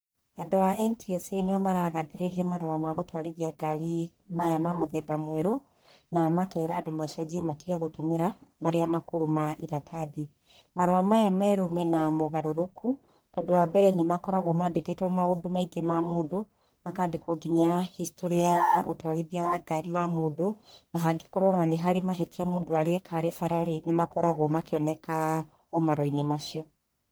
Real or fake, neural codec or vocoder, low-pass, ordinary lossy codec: fake; codec, 44.1 kHz, 1.7 kbps, Pupu-Codec; none; none